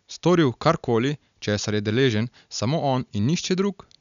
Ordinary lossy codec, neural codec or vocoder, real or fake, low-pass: none; none; real; 7.2 kHz